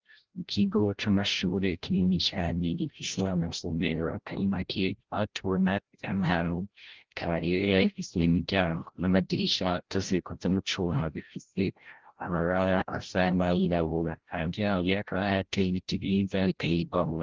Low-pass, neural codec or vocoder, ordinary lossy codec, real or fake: 7.2 kHz; codec, 16 kHz, 0.5 kbps, FreqCodec, larger model; Opus, 16 kbps; fake